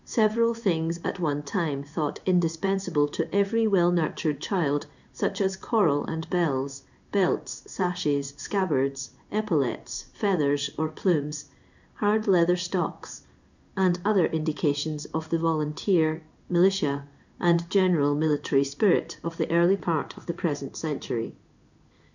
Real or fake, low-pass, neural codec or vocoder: fake; 7.2 kHz; codec, 16 kHz in and 24 kHz out, 1 kbps, XY-Tokenizer